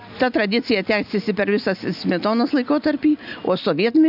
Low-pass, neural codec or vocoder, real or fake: 5.4 kHz; none; real